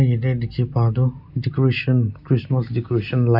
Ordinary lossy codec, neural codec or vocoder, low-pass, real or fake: none; none; 5.4 kHz; real